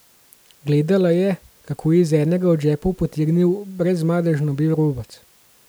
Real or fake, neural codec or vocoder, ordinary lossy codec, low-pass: real; none; none; none